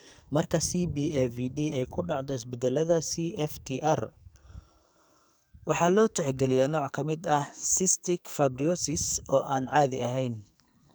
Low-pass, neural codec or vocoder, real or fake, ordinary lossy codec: none; codec, 44.1 kHz, 2.6 kbps, SNAC; fake; none